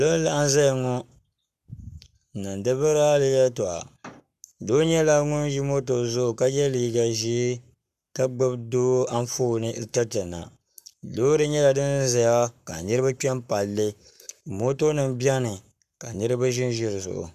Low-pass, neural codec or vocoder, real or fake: 14.4 kHz; codec, 44.1 kHz, 7.8 kbps, Pupu-Codec; fake